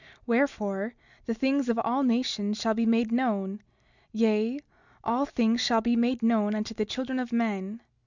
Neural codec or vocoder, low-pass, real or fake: none; 7.2 kHz; real